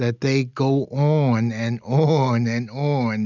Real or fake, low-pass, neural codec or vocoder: real; 7.2 kHz; none